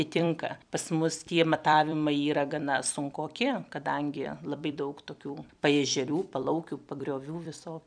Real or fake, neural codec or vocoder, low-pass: fake; vocoder, 44.1 kHz, 128 mel bands every 256 samples, BigVGAN v2; 9.9 kHz